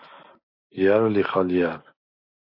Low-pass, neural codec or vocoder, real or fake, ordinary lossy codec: 5.4 kHz; none; real; MP3, 32 kbps